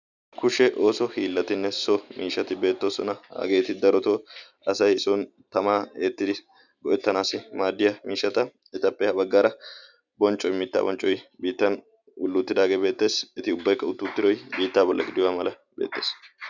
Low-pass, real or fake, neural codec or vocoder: 7.2 kHz; real; none